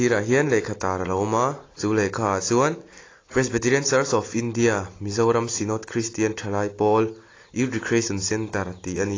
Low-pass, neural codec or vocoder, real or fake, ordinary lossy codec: 7.2 kHz; none; real; AAC, 32 kbps